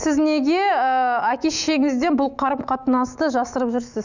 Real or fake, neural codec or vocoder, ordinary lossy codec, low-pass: fake; autoencoder, 48 kHz, 128 numbers a frame, DAC-VAE, trained on Japanese speech; none; 7.2 kHz